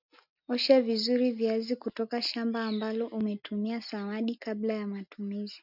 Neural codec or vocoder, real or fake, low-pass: none; real; 5.4 kHz